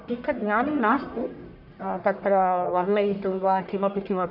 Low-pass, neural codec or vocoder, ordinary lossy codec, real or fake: 5.4 kHz; codec, 44.1 kHz, 1.7 kbps, Pupu-Codec; none; fake